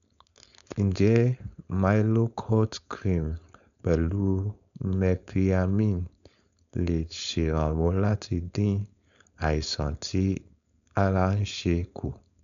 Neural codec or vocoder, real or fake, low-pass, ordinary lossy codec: codec, 16 kHz, 4.8 kbps, FACodec; fake; 7.2 kHz; none